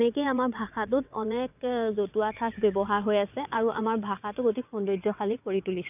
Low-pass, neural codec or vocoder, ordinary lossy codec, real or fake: 3.6 kHz; vocoder, 22.05 kHz, 80 mel bands, WaveNeXt; none; fake